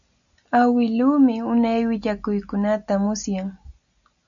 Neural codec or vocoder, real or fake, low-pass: none; real; 7.2 kHz